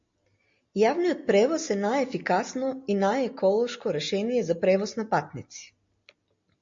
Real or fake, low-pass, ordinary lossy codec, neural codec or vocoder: real; 7.2 kHz; MP3, 48 kbps; none